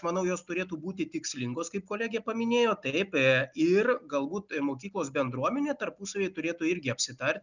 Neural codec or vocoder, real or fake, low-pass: none; real; 7.2 kHz